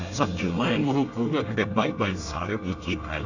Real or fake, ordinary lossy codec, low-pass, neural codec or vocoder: fake; none; 7.2 kHz; codec, 24 kHz, 1 kbps, SNAC